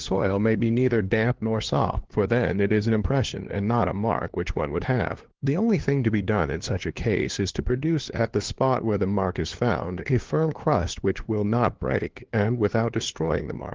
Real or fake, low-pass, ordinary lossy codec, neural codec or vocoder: fake; 7.2 kHz; Opus, 16 kbps; codec, 16 kHz, 2 kbps, FunCodec, trained on LibriTTS, 25 frames a second